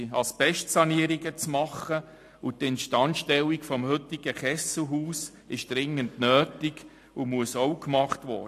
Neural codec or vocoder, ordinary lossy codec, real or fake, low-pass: none; AAC, 64 kbps; real; 14.4 kHz